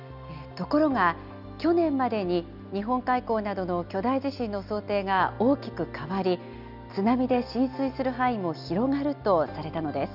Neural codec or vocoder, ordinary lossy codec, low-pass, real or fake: none; none; 5.4 kHz; real